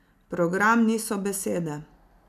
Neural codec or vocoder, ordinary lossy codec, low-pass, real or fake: none; none; 14.4 kHz; real